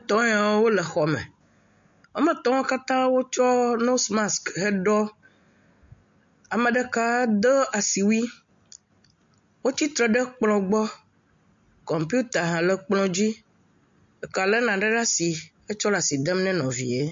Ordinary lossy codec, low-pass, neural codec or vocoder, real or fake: MP3, 48 kbps; 7.2 kHz; none; real